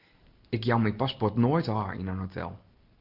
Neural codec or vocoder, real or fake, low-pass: none; real; 5.4 kHz